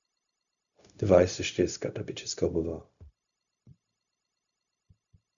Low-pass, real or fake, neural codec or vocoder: 7.2 kHz; fake; codec, 16 kHz, 0.4 kbps, LongCat-Audio-Codec